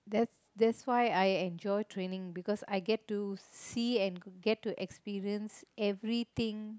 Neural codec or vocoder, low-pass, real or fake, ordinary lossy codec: none; none; real; none